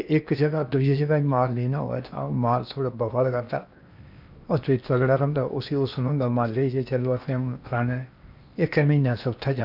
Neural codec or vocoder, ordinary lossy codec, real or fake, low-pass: codec, 16 kHz in and 24 kHz out, 0.8 kbps, FocalCodec, streaming, 65536 codes; none; fake; 5.4 kHz